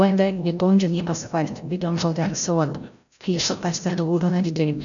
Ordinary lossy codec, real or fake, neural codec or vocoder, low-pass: none; fake; codec, 16 kHz, 0.5 kbps, FreqCodec, larger model; 7.2 kHz